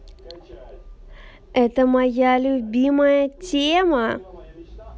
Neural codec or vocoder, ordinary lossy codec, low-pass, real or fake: none; none; none; real